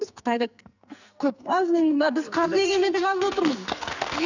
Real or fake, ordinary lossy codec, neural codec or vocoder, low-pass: fake; none; codec, 44.1 kHz, 2.6 kbps, SNAC; 7.2 kHz